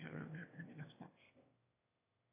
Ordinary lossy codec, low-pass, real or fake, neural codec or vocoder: AAC, 16 kbps; 3.6 kHz; fake; autoencoder, 22.05 kHz, a latent of 192 numbers a frame, VITS, trained on one speaker